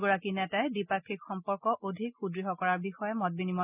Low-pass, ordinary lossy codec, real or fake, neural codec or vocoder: 3.6 kHz; none; real; none